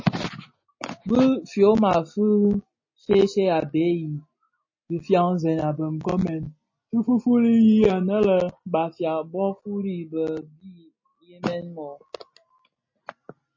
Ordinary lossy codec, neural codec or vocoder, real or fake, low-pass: MP3, 32 kbps; none; real; 7.2 kHz